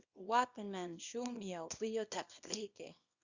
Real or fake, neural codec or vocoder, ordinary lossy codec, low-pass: fake; codec, 24 kHz, 0.9 kbps, WavTokenizer, small release; Opus, 64 kbps; 7.2 kHz